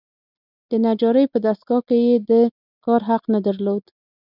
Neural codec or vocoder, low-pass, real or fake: none; 5.4 kHz; real